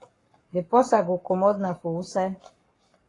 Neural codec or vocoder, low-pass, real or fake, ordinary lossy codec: codec, 44.1 kHz, 7.8 kbps, Pupu-Codec; 10.8 kHz; fake; AAC, 32 kbps